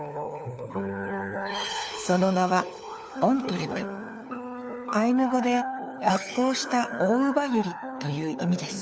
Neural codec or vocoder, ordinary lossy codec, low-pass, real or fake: codec, 16 kHz, 4 kbps, FunCodec, trained on LibriTTS, 50 frames a second; none; none; fake